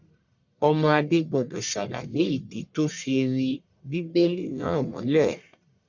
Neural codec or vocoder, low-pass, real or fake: codec, 44.1 kHz, 1.7 kbps, Pupu-Codec; 7.2 kHz; fake